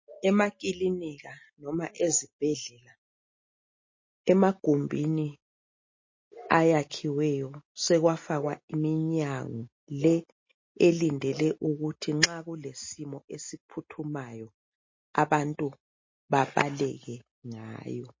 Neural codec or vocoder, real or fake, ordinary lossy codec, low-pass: none; real; MP3, 32 kbps; 7.2 kHz